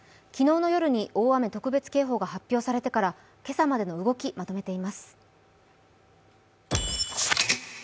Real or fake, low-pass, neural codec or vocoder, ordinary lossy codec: real; none; none; none